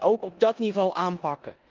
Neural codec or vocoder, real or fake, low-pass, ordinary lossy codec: codec, 16 kHz in and 24 kHz out, 0.9 kbps, LongCat-Audio-Codec, four codebook decoder; fake; 7.2 kHz; Opus, 24 kbps